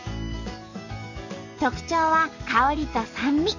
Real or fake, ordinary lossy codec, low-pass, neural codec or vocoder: fake; none; 7.2 kHz; codec, 44.1 kHz, 7.8 kbps, DAC